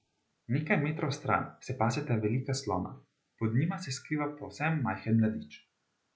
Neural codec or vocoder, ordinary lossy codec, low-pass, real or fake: none; none; none; real